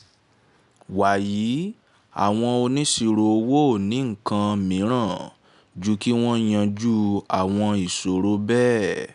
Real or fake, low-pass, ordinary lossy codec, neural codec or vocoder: real; 10.8 kHz; none; none